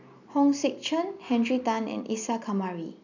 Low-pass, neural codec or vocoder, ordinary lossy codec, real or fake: 7.2 kHz; none; none; real